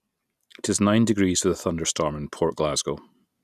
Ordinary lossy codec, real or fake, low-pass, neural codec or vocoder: none; real; 14.4 kHz; none